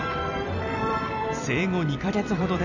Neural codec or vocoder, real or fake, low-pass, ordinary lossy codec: vocoder, 44.1 kHz, 128 mel bands every 256 samples, BigVGAN v2; fake; 7.2 kHz; none